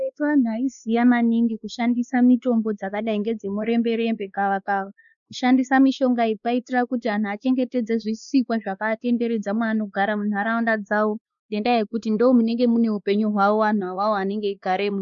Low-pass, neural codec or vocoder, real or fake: 7.2 kHz; codec, 16 kHz, 2 kbps, X-Codec, WavLM features, trained on Multilingual LibriSpeech; fake